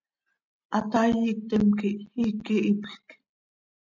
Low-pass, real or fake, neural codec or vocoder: 7.2 kHz; real; none